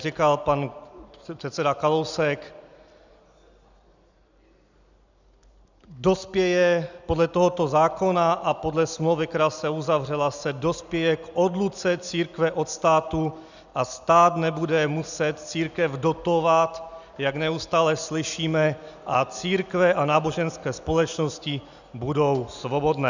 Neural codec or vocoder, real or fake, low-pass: none; real; 7.2 kHz